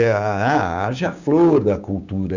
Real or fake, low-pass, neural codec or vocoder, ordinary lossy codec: fake; 7.2 kHz; codec, 16 kHz, 6 kbps, DAC; none